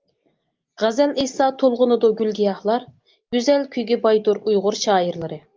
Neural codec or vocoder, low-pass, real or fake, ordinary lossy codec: none; 7.2 kHz; real; Opus, 32 kbps